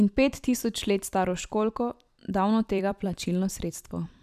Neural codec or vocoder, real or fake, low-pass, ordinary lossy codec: vocoder, 44.1 kHz, 128 mel bands every 256 samples, BigVGAN v2; fake; 14.4 kHz; none